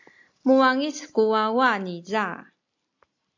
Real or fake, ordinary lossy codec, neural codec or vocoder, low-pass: real; AAC, 48 kbps; none; 7.2 kHz